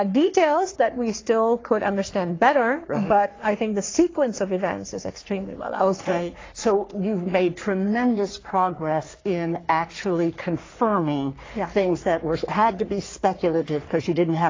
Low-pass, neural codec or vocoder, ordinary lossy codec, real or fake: 7.2 kHz; codec, 44.1 kHz, 3.4 kbps, Pupu-Codec; AAC, 32 kbps; fake